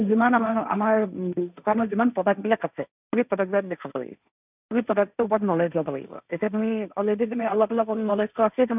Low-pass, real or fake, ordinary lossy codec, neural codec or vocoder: 3.6 kHz; fake; none; codec, 16 kHz, 1.1 kbps, Voila-Tokenizer